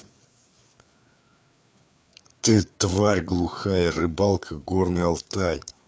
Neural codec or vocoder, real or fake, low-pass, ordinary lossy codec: codec, 16 kHz, 4 kbps, FreqCodec, larger model; fake; none; none